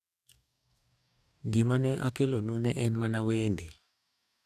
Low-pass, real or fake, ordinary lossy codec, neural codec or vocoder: 14.4 kHz; fake; none; codec, 44.1 kHz, 2.6 kbps, DAC